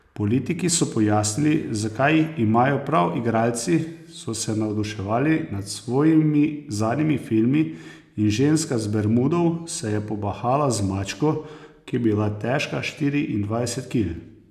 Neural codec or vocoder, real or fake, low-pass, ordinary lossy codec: none; real; 14.4 kHz; none